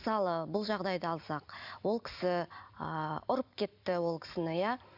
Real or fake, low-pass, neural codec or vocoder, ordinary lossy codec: real; 5.4 kHz; none; none